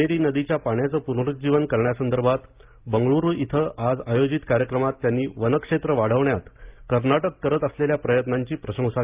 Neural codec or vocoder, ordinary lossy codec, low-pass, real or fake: none; Opus, 32 kbps; 3.6 kHz; real